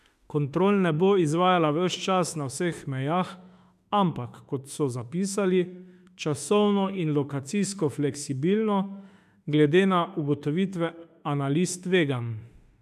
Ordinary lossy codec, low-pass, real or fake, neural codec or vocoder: none; 14.4 kHz; fake; autoencoder, 48 kHz, 32 numbers a frame, DAC-VAE, trained on Japanese speech